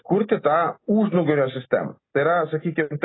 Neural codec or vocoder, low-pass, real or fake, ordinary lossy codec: none; 7.2 kHz; real; AAC, 16 kbps